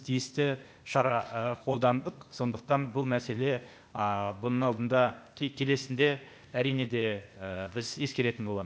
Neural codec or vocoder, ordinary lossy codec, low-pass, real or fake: codec, 16 kHz, 0.8 kbps, ZipCodec; none; none; fake